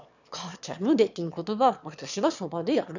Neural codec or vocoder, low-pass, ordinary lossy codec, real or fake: autoencoder, 22.05 kHz, a latent of 192 numbers a frame, VITS, trained on one speaker; 7.2 kHz; none; fake